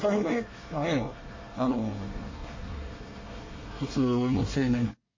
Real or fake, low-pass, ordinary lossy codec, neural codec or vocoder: fake; 7.2 kHz; MP3, 32 kbps; codec, 24 kHz, 1 kbps, SNAC